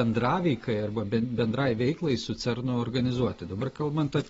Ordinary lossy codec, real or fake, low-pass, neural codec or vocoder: AAC, 24 kbps; real; 10.8 kHz; none